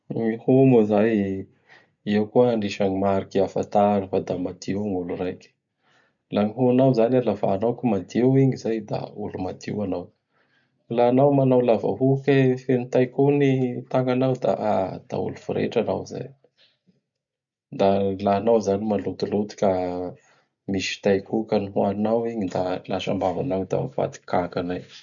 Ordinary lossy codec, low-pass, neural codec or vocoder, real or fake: none; 7.2 kHz; none; real